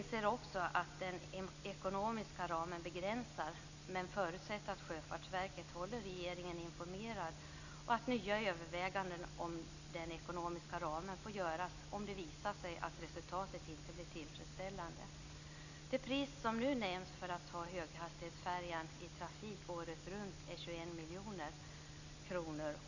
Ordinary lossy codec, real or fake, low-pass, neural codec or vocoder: none; real; 7.2 kHz; none